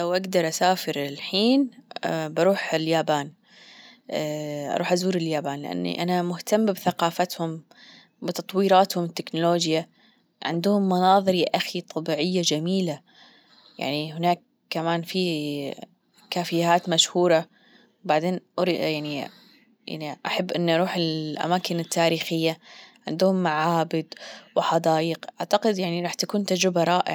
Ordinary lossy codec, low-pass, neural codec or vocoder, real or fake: none; none; none; real